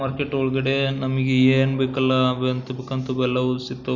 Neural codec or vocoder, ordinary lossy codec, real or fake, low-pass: none; none; real; 7.2 kHz